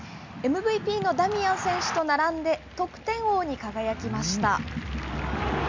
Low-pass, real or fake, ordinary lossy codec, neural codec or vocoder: 7.2 kHz; real; MP3, 64 kbps; none